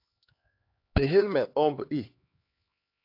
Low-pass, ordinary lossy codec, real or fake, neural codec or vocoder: 5.4 kHz; AAC, 32 kbps; fake; codec, 16 kHz, 2 kbps, X-Codec, HuBERT features, trained on LibriSpeech